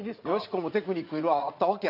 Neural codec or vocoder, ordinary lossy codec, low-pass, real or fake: vocoder, 44.1 kHz, 80 mel bands, Vocos; none; 5.4 kHz; fake